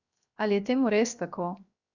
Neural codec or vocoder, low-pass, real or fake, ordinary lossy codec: codec, 16 kHz, 0.7 kbps, FocalCodec; 7.2 kHz; fake; none